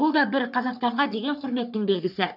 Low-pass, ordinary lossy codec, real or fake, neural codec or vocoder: 5.4 kHz; none; fake; codec, 44.1 kHz, 3.4 kbps, Pupu-Codec